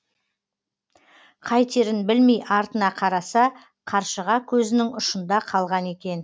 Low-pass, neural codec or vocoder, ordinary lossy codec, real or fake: none; none; none; real